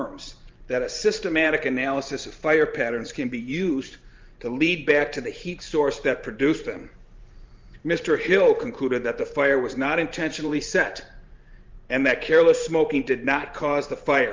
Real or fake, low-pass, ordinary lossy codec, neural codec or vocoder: fake; 7.2 kHz; Opus, 24 kbps; vocoder, 44.1 kHz, 128 mel bands every 512 samples, BigVGAN v2